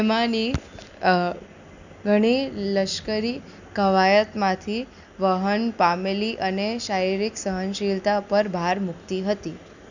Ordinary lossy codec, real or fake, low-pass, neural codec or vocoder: none; real; 7.2 kHz; none